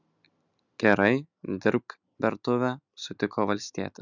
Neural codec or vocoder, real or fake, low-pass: none; real; 7.2 kHz